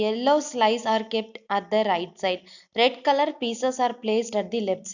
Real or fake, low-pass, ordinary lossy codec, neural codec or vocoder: real; 7.2 kHz; none; none